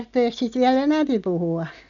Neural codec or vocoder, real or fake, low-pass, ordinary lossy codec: none; real; 7.2 kHz; none